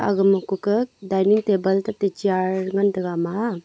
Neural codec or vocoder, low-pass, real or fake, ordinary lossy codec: none; none; real; none